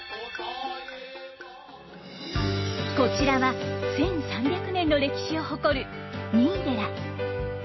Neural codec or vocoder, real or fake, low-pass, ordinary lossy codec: none; real; 7.2 kHz; MP3, 24 kbps